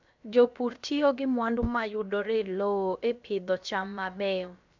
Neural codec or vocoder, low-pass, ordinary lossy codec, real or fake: codec, 16 kHz, about 1 kbps, DyCAST, with the encoder's durations; 7.2 kHz; MP3, 64 kbps; fake